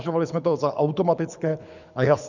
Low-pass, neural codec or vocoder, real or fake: 7.2 kHz; codec, 24 kHz, 6 kbps, HILCodec; fake